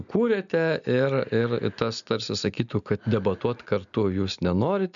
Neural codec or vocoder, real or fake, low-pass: none; real; 7.2 kHz